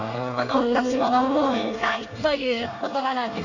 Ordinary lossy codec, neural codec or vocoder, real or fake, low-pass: none; codec, 24 kHz, 1 kbps, SNAC; fake; 7.2 kHz